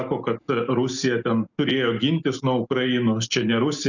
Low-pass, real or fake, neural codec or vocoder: 7.2 kHz; real; none